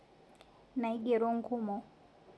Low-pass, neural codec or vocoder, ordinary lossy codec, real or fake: none; none; none; real